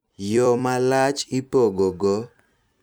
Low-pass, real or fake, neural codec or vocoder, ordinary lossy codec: none; fake; vocoder, 44.1 kHz, 128 mel bands every 256 samples, BigVGAN v2; none